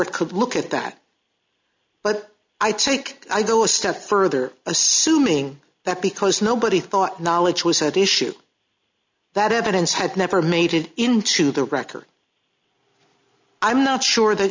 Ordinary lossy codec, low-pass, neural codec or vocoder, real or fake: MP3, 64 kbps; 7.2 kHz; none; real